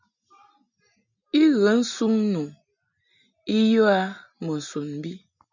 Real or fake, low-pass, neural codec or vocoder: real; 7.2 kHz; none